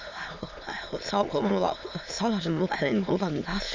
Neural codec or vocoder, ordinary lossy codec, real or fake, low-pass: autoencoder, 22.05 kHz, a latent of 192 numbers a frame, VITS, trained on many speakers; MP3, 64 kbps; fake; 7.2 kHz